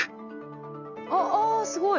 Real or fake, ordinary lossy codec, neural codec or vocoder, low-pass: real; none; none; 7.2 kHz